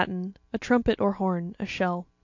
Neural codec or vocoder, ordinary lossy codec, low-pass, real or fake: none; AAC, 48 kbps; 7.2 kHz; real